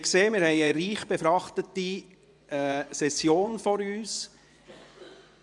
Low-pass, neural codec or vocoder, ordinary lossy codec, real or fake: 10.8 kHz; vocoder, 24 kHz, 100 mel bands, Vocos; none; fake